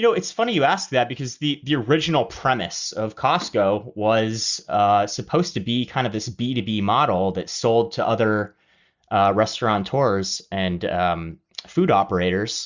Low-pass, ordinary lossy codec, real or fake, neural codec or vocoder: 7.2 kHz; Opus, 64 kbps; real; none